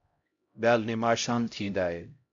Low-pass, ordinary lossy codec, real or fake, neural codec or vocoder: 7.2 kHz; MP3, 48 kbps; fake; codec, 16 kHz, 0.5 kbps, X-Codec, HuBERT features, trained on LibriSpeech